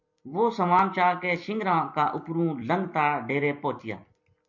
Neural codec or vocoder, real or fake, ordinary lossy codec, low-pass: vocoder, 44.1 kHz, 128 mel bands every 256 samples, BigVGAN v2; fake; MP3, 48 kbps; 7.2 kHz